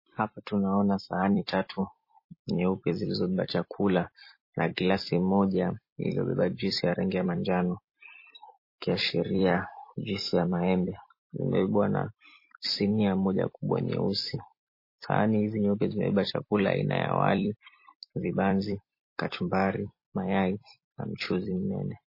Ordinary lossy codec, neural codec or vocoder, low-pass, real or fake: MP3, 24 kbps; vocoder, 44.1 kHz, 128 mel bands every 256 samples, BigVGAN v2; 5.4 kHz; fake